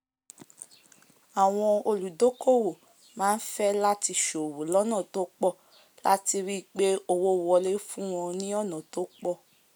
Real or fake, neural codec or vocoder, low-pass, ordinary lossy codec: real; none; none; none